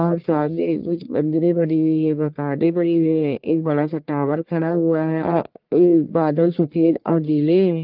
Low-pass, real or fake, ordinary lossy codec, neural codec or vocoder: 5.4 kHz; fake; Opus, 32 kbps; codec, 44.1 kHz, 1.7 kbps, Pupu-Codec